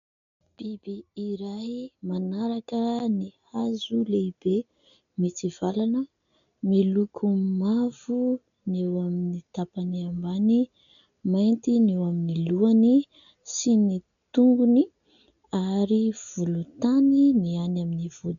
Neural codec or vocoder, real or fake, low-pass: none; real; 7.2 kHz